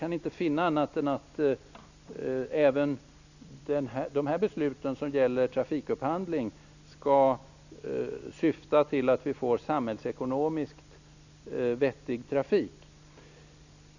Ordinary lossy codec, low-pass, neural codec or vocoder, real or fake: none; 7.2 kHz; none; real